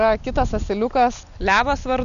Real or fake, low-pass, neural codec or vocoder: real; 7.2 kHz; none